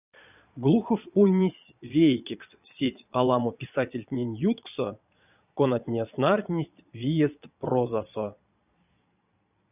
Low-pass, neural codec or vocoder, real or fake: 3.6 kHz; vocoder, 22.05 kHz, 80 mel bands, Vocos; fake